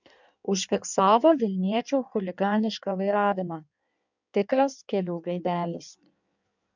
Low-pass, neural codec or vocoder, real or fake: 7.2 kHz; codec, 16 kHz in and 24 kHz out, 1.1 kbps, FireRedTTS-2 codec; fake